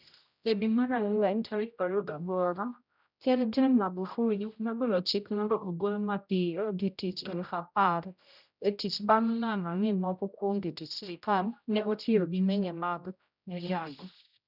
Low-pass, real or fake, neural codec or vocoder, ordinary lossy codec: 5.4 kHz; fake; codec, 16 kHz, 0.5 kbps, X-Codec, HuBERT features, trained on general audio; none